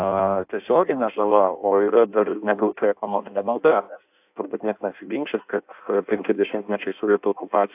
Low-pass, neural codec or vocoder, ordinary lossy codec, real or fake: 3.6 kHz; codec, 16 kHz in and 24 kHz out, 0.6 kbps, FireRedTTS-2 codec; AAC, 32 kbps; fake